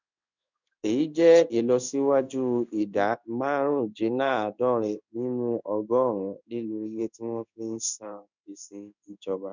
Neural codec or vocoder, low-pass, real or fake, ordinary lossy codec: codec, 16 kHz in and 24 kHz out, 1 kbps, XY-Tokenizer; 7.2 kHz; fake; none